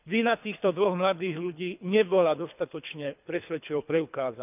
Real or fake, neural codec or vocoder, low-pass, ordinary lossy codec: fake; codec, 24 kHz, 3 kbps, HILCodec; 3.6 kHz; none